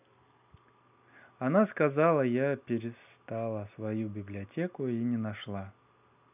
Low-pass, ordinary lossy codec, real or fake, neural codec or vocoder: 3.6 kHz; none; real; none